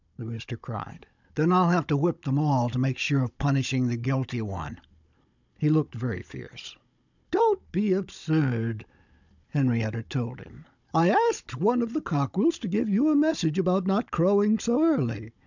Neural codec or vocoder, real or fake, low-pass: codec, 16 kHz, 16 kbps, FunCodec, trained on Chinese and English, 50 frames a second; fake; 7.2 kHz